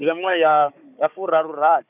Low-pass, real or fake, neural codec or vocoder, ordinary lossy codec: 3.6 kHz; fake; codec, 16 kHz, 4 kbps, FunCodec, trained on Chinese and English, 50 frames a second; none